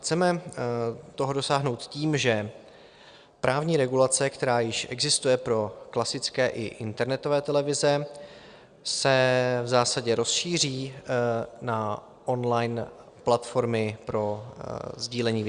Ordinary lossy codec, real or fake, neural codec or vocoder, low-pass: Opus, 64 kbps; real; none; 9.9 kHz